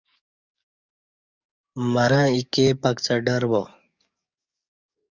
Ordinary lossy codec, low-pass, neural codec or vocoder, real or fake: Opus, 64 kbps; 7.2 kHz; codec, 44.1 kHz, 7.8 kbps, DAC; fake